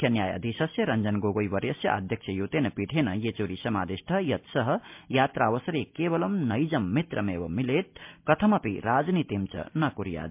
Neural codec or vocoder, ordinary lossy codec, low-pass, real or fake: none; MP3, 32 kbps; 3.6 kHz; real